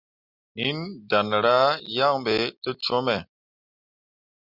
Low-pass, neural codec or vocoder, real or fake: 5.4 kHz; none; real